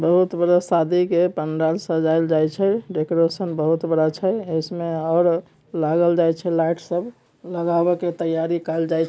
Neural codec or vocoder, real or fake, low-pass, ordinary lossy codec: none; real; none; none